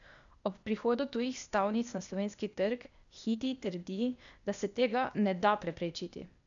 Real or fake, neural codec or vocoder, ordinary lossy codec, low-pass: fake; codec, 16 kHz, 0.8 kbps, ZipCodec; MP3, 96 kbps; 7.2 kHz